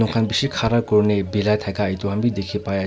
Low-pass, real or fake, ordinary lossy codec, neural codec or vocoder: none; real; none; none